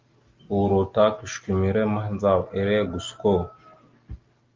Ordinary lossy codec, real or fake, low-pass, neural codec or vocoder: Opus, 32 kbps; real; 7.2 kHz; none